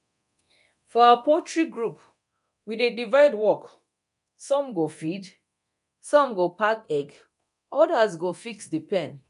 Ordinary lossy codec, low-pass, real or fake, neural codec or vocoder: none; 10.8 kHz; fake; codec, 24 kHz, 0.9 kbps, DualCodec